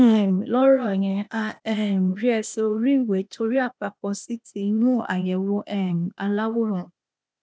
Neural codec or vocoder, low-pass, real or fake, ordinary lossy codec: codec, 16 kHz, 0.8 kbps, ZipCodec; none; fake; none